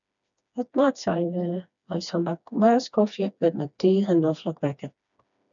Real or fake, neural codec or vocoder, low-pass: fake; codec, 16 kHz, 2 kbps, FreqCodec, smaller model; 7.2 kHz